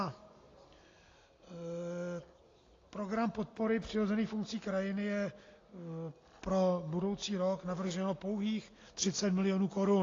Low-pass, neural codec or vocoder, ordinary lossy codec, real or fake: 7.2 kHz; none; AAC, 32 kbps; real